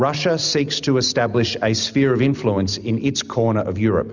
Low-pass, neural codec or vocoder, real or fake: 7.2 kHz; none; real